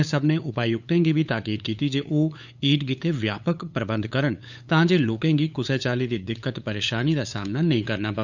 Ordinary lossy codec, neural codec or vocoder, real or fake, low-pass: none; codec, 16 kHz, 8 kbps, FunCodec, trained on LibriTTS, 25 frames a second; fake; 7.2 kHz